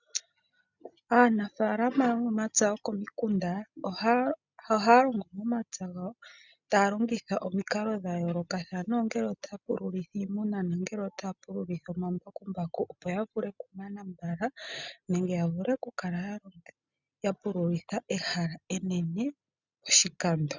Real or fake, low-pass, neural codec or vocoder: real; 7.2 kHz; none